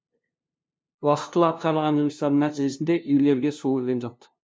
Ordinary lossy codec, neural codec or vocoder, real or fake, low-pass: none; codec, 16 kHz, 0.5 kbps, FunCodec, trained on LibriTTS, 25 frames a second; fake; none